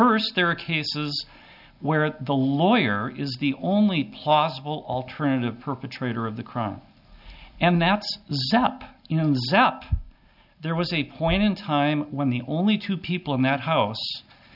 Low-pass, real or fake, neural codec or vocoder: 5.4 kHz; real; none